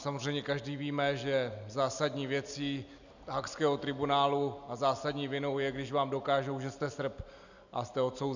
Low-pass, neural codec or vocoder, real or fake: 7.2 kHz; none; real